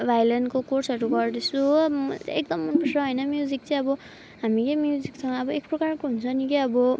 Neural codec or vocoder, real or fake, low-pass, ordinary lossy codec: none; real; none; none